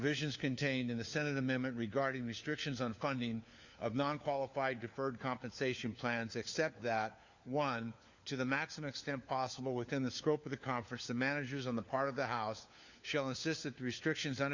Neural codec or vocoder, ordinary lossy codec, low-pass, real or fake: codec, 16 kHz, 4 kbps, FunCodec, trained on LibriTTS, 50 frames a second; Opus, 64 kbps; 7.2 kHz; fake